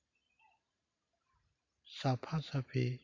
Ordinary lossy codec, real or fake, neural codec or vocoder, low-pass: MP3, 48 kbps; fake; vocoder, 44.1 kHz, 128 mel bands every 512 samples, BigVGAN v2; 7.2 kHz